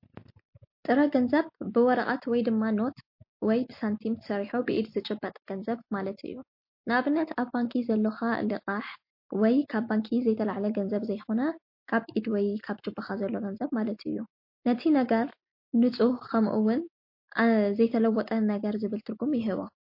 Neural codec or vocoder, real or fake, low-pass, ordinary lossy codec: none; real; 5.4 kHz; MP3, 32 kbps